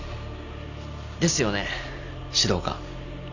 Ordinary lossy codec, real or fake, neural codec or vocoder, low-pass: none; real; none; 7.2 kHz